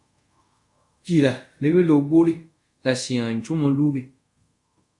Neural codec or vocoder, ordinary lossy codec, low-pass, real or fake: codec, 24 kHz, 0.5 kbps, DualCodec; Opus, 64 kbps; 10.8 kHz; fake